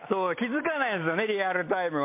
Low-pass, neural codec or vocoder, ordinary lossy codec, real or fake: 3.6 kHz; none; MP3, 24 kbps; real